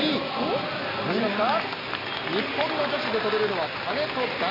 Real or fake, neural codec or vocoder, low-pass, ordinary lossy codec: real; none; 5.4 kHz; AAC, 24 kbps